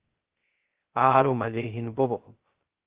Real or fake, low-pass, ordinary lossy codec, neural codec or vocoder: fake; 3.6 kHz; Opus, 16 kbps; codec, 16 kHz, 0.2 kbps, FocalCodec